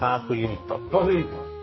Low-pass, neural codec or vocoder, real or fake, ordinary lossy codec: 7.2 kHz; codec, 44.1 kHz, 2.6 kbps, SNAC; fake; MP3, 24 kbps